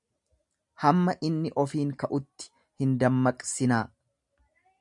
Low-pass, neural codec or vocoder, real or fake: 10.8 kHz; none; real